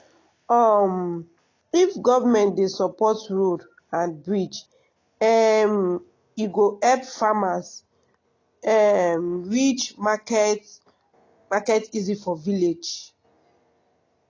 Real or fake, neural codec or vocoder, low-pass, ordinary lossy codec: real; none; 7.2 kHz; AAC, 32 kbps